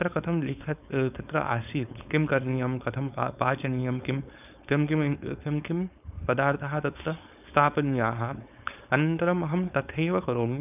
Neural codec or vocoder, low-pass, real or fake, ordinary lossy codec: codec, 16 kHz, 4.8 kbps, FACodec; 3.6 kHz; fake; none